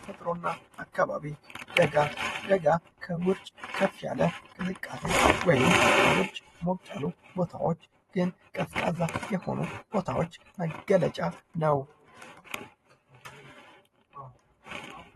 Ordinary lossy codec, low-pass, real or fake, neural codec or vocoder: AAC, 32 kbps; 19.8 kHz; fake; vocoder, 44.1 kHz, 128 mel bands every 512 samples, BigVGAN v2